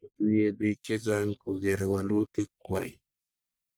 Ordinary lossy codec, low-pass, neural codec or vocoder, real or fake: none; none; codec, 44.1 kHz, 1.7 kbps, Pupu-Codec; fake